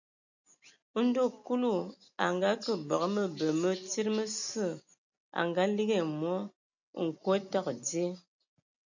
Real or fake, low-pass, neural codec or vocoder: real; 7.2 kHz; none